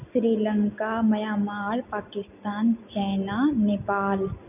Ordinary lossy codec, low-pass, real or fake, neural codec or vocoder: none; 3.6 kHz; real; none